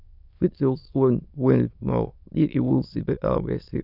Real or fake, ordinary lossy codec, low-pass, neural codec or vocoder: fake; none; 5.4 kHz; autoencoder, 22.05 kHz, a latent of 192 numbers a frame, VITS, trained on many speakers